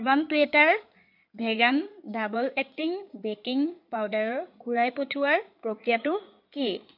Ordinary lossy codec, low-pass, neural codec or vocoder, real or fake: none; 5.4 kHz; codec, 16 kHz in and 24 kHz out, 2.2 kbps, FireRedTTS-2 codec; fake